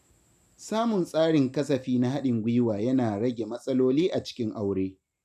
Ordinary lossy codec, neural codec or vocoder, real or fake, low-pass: none; none; real; 14.4 kHz